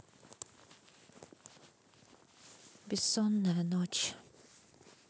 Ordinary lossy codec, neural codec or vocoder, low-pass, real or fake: none; none; none; real